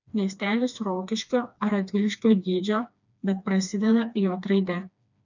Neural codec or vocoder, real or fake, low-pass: codec, 16 kHz, 2 kbps, FreqCodec, smaller model; fake; 7.2 kHz